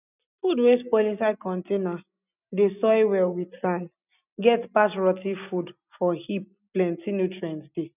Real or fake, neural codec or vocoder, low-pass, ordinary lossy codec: real; none; 3.6 kHz; none